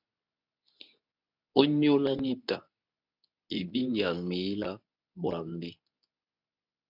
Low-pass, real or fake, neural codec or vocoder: 5.4 kHz; fake; codec, 24 kHz, 0.9 kbps, WavTokenizer, medium speech release version 2